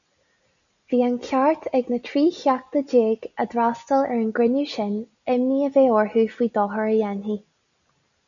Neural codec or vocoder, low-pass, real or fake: none; 7.2 kHz; real